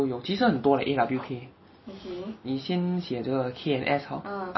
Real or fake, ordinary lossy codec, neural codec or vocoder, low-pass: real; MP3, 24 kbps; none; 7.2 kHz